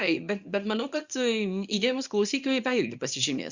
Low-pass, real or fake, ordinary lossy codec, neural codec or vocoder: 7.2 kHz; fake; Opus, 64 kbps; codec, 24 kHz, 0.9 kbps, WavTokenizer, small release